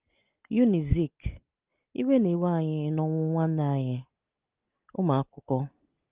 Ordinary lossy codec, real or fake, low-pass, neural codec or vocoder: Opus, 32 kbps; real; 3.6 kHz; none